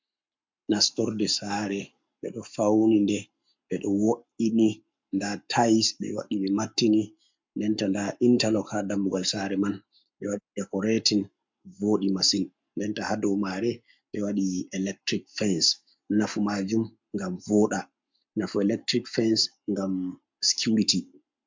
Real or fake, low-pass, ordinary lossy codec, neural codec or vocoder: fake; 7.2 kHz; MP3, 64 kbps; codec, 44.1 kHz, 7.8 kbps, Pupu-Codec